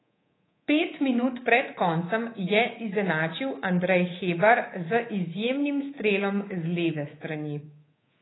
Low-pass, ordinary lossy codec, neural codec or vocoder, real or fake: 7.2 kHz; AAC, 16 kbps; codec, 24 kHz, 3.1 kbps, DualCodec; fake